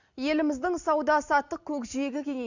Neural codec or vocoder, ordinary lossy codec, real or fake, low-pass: none; none; real; 7.2 kHz